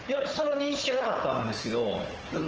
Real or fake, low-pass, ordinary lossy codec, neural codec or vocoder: fake; 7.2 kHz; Opus, 16 kbps; codec, 16 kHz, 4 kbps, FunCodec, trained on Chinese and English, 50 frames a second